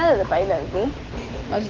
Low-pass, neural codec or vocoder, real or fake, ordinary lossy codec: 7.2 kHz; none; real; Opus, 16 kbps